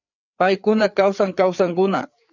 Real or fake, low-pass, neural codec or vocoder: fake; 7.2 kHz; codec, 16 kHz, 4 kbps, FreqCodec, larger model